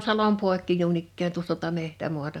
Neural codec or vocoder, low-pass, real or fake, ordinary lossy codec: none; 19.8 kHz; real; none